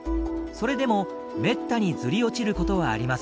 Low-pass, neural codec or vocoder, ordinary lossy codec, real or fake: none; none; none; real